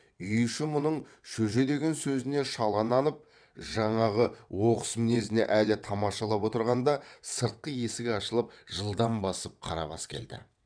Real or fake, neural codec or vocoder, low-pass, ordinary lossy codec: fake; vocoder, 22.05 kHz, 80 mel bands, WaveNeXt; 9.9 kHz; none